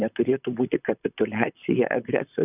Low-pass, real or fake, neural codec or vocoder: 3.6 kHz; fake; vocoder, 44.1 kHz, 128 mel bands, Pupu-Vocoder